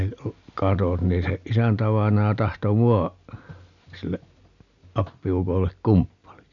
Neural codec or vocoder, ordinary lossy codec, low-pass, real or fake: none; none; 7.2 kHz; real